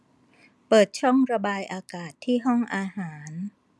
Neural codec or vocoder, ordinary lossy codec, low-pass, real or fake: none; none; none; real